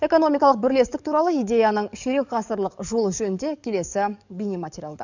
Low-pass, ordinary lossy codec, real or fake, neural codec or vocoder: 7.2 kHz; none; fake; codec, 44.1 kHz, 7.8 kbps, DAC